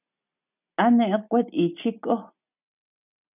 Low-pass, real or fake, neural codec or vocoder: 3.6 kHz; real; none